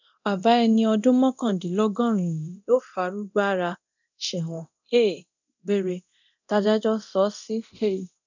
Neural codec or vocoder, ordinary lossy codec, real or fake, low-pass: codec, 24 kHz, 0.9 kbps, DualCodec; none; fake; 7.2 kHz